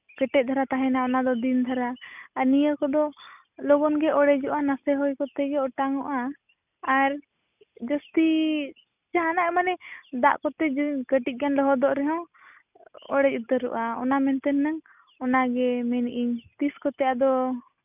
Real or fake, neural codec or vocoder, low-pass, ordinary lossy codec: real; none; 3.6 kHz; none